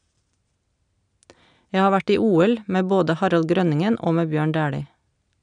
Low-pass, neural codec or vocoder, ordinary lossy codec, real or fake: 9.9 kHz; none; none; real